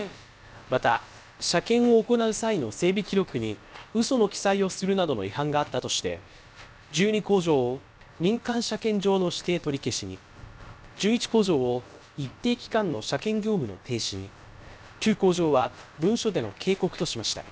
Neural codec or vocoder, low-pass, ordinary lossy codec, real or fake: codec, 16 kHz, about 1 kbps, DyCAST, with the encoder's durations; none; none; fake